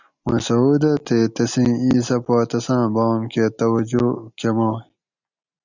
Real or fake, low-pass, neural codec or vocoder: real; 7.2 kHz; none